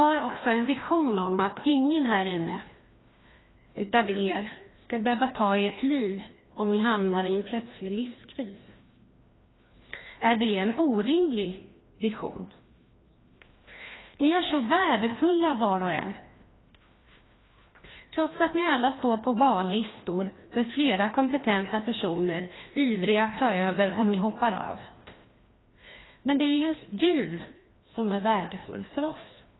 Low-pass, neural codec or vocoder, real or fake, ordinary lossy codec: 7.2 kHz; codec, 16 kHz, 1 kbps, FreqCodec, larger model; fake; AAC, 16 kbps